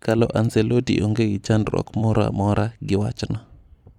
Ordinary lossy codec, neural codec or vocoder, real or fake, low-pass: none; vocoder, 44.1 kHz, 128 mel bands every 256 samples, BigVGAN v2; fake; 19.8 kHz